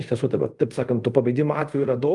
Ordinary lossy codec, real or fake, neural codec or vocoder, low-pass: Opus, 32 kbps; fake; codec, 24 kHz, 0.5 kbps, DualCodec; 10.8 kHz